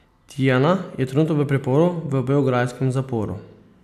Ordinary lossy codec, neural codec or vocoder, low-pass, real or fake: none; none; 14.4 kHz; real